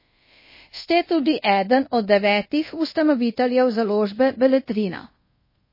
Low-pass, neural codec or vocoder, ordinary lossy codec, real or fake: 5.4 kHz; codec, 24 kHz, 0.5 kbps, DualCodec; MP3, 24 kbps; fake